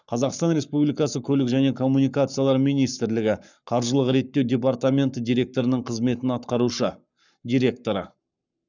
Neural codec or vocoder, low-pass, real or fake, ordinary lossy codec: codec, 44.1 kHz, 7.8 kbps, Pupu-Codec; 7.2 kHz; fake; none